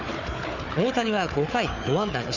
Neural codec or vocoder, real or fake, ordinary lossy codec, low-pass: codec, 16 kHz, 4 kbps, FunCodec, trained on Chinese and English, 50 frames a second; fake; none; 7.2 kHz